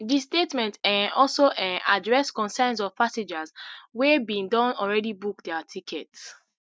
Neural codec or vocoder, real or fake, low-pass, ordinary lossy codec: none; real; none; none